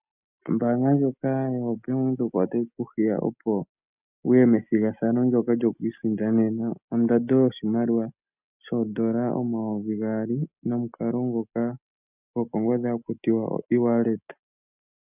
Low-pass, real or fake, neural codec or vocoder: 3.6 kHz; real; none